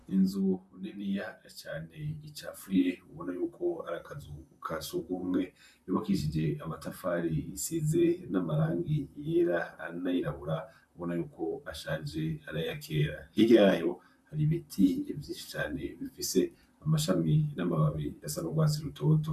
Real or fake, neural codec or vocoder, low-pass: fake; vocoder, 44.1 kHz, 128 mel bands, Pupu-Vocoder; 14.4 kHz